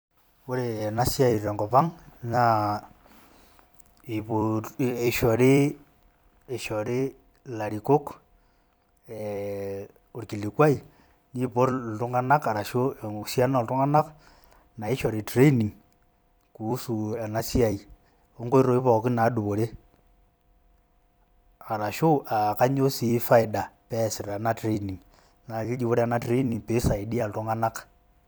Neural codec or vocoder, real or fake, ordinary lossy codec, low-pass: vocoder, 44.1 kHz, 128 mel bands every 256 samples, BigVGAN v2; fake; none; none